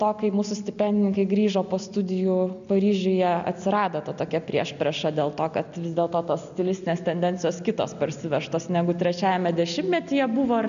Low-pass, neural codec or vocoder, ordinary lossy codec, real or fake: 7.2 kHz; none; MP3, 96 kbps; real